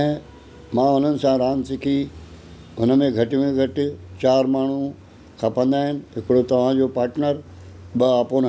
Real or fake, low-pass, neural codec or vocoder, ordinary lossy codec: real; none; none; none